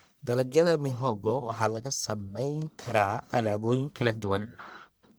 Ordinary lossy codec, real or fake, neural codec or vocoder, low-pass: none; fake; codec, 44.1 kHz, 1.7 kbps, Pupu-Codec; none